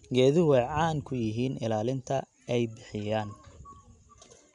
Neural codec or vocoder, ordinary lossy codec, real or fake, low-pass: none; none; real; 10.8 kHz